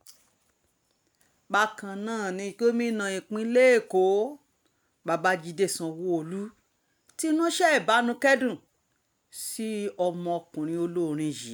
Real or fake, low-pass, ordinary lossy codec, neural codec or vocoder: real; none; none; none